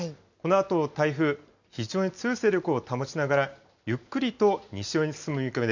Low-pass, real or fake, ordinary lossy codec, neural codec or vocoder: 7.2 kHz; real; none; none